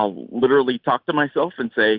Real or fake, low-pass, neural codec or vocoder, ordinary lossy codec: real; 5.4 kHz; none; Opus, 64 kbps